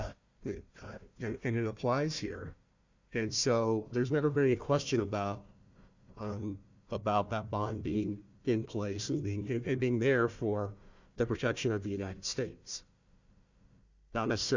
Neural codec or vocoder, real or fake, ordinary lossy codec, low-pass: codec, 16 kHz, 1 kbps, FunCodec, trained on Chinese and English, 50 frames a second; fake; Opus, 64 kbps; 7.2 kHz